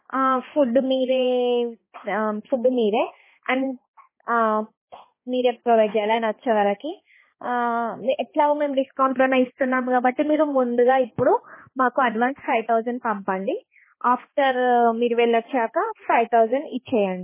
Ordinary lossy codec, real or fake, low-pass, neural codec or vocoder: MP3, 16 kbps; fake; 3.6 kHz; codec, 16 kHz, 2 kbps, X-Codec, HuBERT features, trained on balanced general audio